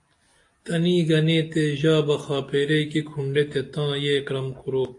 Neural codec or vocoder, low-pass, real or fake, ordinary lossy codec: none; 10.8 kHz; real; AAC, 48 kbps